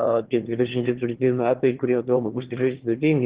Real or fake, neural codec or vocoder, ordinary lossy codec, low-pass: fake; autoencoder, 22.05 kHz, a latent of 192 numbers a frame, VITS, trained on one speaker; Opus, 16 kbps; 3.6 kHz